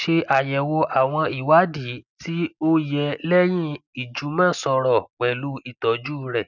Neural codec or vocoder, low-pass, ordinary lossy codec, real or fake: none; 7.2 kHz; none; real